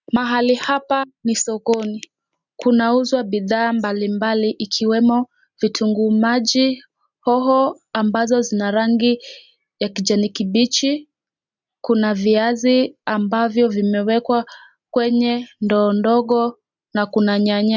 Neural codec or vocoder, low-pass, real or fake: none; 7.2 kHz; real